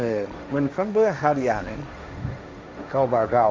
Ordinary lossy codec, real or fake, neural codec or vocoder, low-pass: none; fake; codec, 16 kHz, 1.1 kbps, Voila-Tokenizer; none